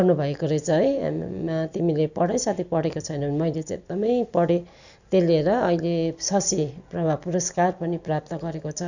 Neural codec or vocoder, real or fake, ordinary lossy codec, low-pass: none; real; none; 7.2 kHz